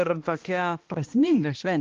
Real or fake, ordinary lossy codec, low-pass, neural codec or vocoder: fake; Opus, 32 kbps; 7.2 kHz; codec, 16 kHz, 1 kbps, X-Codec, HuBERT features, trained on balanced general audio